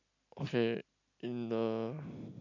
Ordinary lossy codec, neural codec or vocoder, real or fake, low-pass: none; none; real; 7.2 kHz